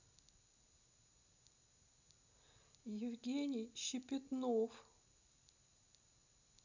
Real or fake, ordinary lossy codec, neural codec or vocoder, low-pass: real; none; none; 7.2 kHz